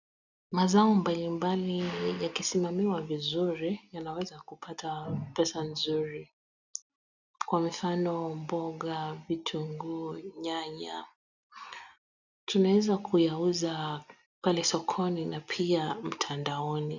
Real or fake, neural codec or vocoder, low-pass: real; none; 7.2 kHz